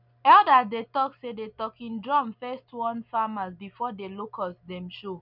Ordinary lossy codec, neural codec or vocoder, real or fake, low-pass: none; none; real; 5.4 kHz